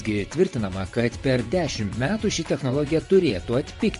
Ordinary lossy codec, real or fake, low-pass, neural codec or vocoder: MP3, 48 kbps; fake; 10.8 kHz; vocoder, 24 kHz, 100 mel bands, Vocos